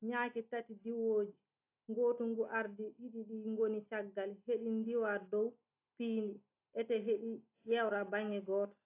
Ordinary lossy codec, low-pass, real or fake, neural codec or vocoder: AAC, 24 kbps; 3.6 kHz; real; none